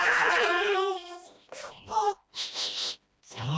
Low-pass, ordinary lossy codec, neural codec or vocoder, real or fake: none; none; codec, 16 kHz, 1 kbps, FreqCodec, smaller model; fake